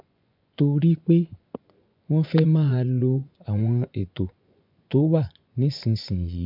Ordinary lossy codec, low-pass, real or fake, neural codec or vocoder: MP3, 48 kbps; 5.4 kHz; fake; vocoder, 24 kHz, 100 mel bands, Vocos